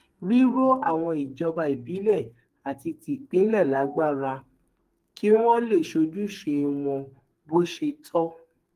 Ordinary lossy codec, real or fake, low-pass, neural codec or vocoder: Opus, 24 kbps; fake; 14.4 kHz; codec, 32 kHz, 1.9 kbps, SNAC